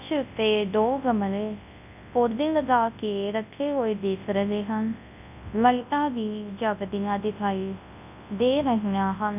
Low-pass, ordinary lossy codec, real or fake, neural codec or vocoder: 3.6 kHz; none; fake; codec, 24 kHz, 0.9 kbps, WavTokenizer, large speech release